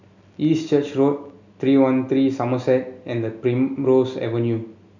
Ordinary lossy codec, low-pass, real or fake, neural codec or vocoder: none; 7.2 kHz; real; none